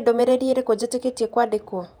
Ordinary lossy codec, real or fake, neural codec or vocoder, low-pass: none; fake; vocoder, 48 kHz, 128 mel bands, Vocos; 19.8 kHz